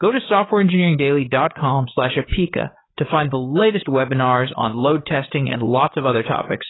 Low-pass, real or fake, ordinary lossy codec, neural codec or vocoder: 7.2 kHz; fake; AAC, 16 kbps; codec, 16 kHz, 4 kbps, FreqCodec, larger model